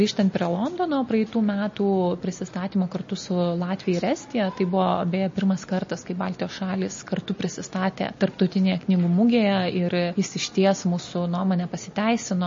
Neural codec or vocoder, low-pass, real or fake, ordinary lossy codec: none; 7.2 kHz; real; MP3, 32 kbps